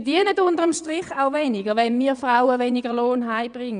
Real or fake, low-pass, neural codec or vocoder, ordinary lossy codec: fake; 9.9 kHz; vocoder, 22.05 kHz, 80 mel bands, WaveNeXt; none